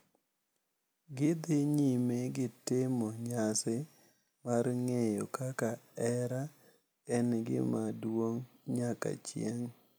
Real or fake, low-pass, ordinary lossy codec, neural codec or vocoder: real; none; none; none